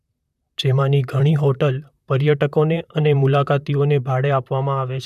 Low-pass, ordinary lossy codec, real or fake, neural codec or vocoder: 14.4 kHz; none; fake; vocoder, 44.1 kHz, 128 mel bands, Pupu-Vocoder